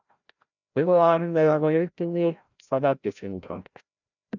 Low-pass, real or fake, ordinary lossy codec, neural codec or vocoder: 7.2 kHz; fake; none; codec, 16 kHz, 0.5 kbps, FreqCodec, larger model